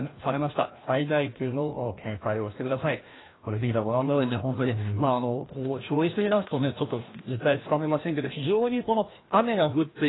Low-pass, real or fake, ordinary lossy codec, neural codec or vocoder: 7.2 kHz; fake; AAC, 16 kbps; codec, 16 kHz, 1 kbps, FreqCodec, larger model